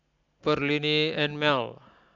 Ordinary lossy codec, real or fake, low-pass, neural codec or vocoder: none; real; 7.2 kHz; none